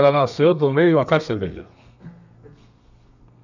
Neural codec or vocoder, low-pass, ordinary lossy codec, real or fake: codec, 32 kHz, 1.9 kbps, SNAC; 7.2 kHz; none; fake